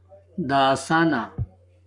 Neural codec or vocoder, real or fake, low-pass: codec, 44.1 kHz, 7.8 kbps, Pupu-Codec; fake; 10.8 kHz